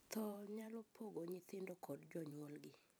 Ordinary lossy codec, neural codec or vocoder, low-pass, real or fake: none; vocoder, 44.1 kHz, 128 mel bands every 512 samples, BigVGAN v2; none; fake